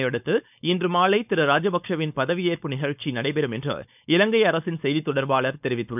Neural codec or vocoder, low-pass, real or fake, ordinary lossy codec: codec, 16 kHz, 4.8 kbps, FACodec; 3.6 kHz; fake; none